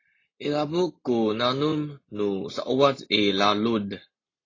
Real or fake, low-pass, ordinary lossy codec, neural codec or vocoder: real; 7.2 kHz; AAC, 32 kbps; none